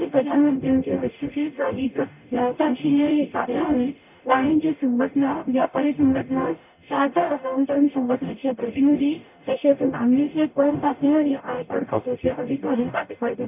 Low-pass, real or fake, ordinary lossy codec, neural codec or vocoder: 3.6 kHz; fake; none; codec, 44.1 kHz, 0.9 kbps, DAC